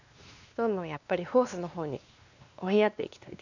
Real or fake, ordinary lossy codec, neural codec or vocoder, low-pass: fake; none; codec, 16 kHz, 2 kbps, X-Codec, WavLM features, trained on Multilingual LibriSpeech; 7.2 kHz